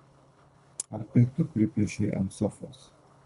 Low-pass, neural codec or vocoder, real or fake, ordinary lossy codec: 10.8 kHz; codec, 24 kHz, 3 kbps, HILCodec; fake; MP3, 64 kbps